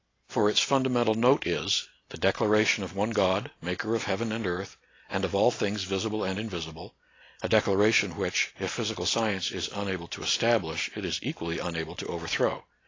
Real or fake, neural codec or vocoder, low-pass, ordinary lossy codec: real; none; 7.2 kHz; AAC, 32 kbps